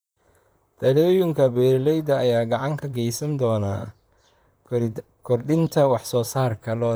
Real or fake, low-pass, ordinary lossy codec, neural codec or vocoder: fake; none; none; vocoder, 44.1 kHz, 128 mel bands, Pupu-Vocoder